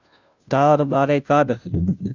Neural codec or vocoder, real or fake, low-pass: codec, 16 kHz, 0.5 kbps, FunCodec, trained on Chinese and English, 25 frames a second; fake; 7.2 kHz